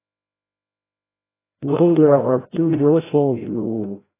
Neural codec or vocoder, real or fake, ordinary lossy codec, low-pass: codec, 16 kHz, 0.5 kbps, FreqCodec, larger model; fake; AAC, 16 kbps; 3.6 kHz